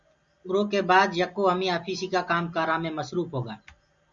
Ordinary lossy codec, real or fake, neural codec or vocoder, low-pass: Opus, 64 kbps; real; none; 7.2 kHz